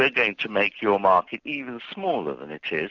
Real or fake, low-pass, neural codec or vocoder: real; 7.2 kHz; none